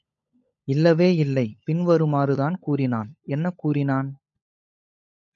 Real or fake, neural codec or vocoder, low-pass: fake; codec, 16 kHz, 16 kbps, FunCodec, trained on LibriTTS, 50 frames a second; 7.2 kHz